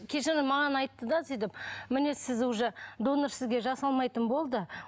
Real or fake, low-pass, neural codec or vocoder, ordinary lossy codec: real; none; none; none